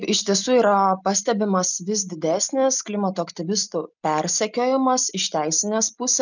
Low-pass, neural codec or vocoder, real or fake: 7.2 kHz; none; real